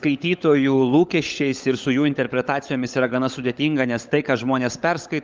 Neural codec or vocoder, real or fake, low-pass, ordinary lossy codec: codec, 16 kHz, 16 kbps, FunCodec, trained on LibriTTS, 50 frames a second; fake; 7.2 kHz; Opus, 24 kbps